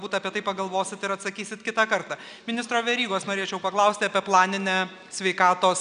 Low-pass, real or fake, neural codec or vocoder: 9.9 kHz; real; none